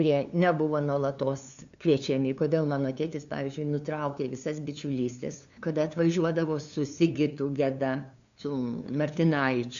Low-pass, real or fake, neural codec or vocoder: 7.2 kHz; fake; codec, 16 kHz, 2 kbps, FunCodec, trained on LibriTTS, 25 frames a second